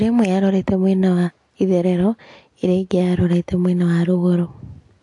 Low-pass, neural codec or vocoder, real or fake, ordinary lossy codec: 10.8 kHz; none; real; AAC, 48 kbps